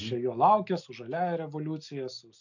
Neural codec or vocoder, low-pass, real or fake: none; 7.2 kHz; real